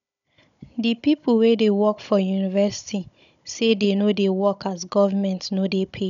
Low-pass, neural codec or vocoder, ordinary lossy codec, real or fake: 7.2 kHz; codec, 16 kHz, 16 kbps, FunCodec, trained on Chinese and English, 50 frames a second; none; fake